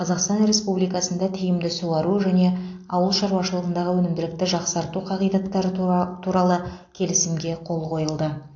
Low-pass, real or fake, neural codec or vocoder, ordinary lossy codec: 7.2 kHz; real; none; AAC, 48 kbps